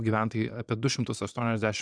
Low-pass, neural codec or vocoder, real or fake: 9.9 kHz; vocoder, 22.05 kHz, 80 mel bands, Vocos; fake